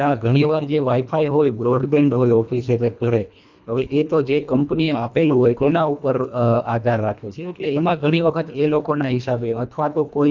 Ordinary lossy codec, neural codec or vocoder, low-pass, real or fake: none; codec, 24 kHz, 1.5 kbps, HILCodec; 7.2 kHz; fake